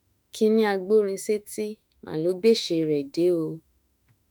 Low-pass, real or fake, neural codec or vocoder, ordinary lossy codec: none; fake; autoencoder, 48 kHz, 32 numbers a frame, DAC-VAE, trained on Japanese speech; none